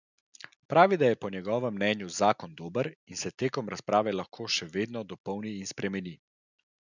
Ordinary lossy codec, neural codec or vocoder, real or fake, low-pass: none; none; real; 7.2 kHz